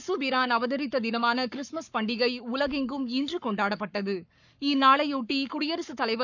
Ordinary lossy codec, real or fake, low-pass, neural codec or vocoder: none; fake; 7.2 kHz; codec, 44.1 kHz, 7.8 kbps, Pupu-Codec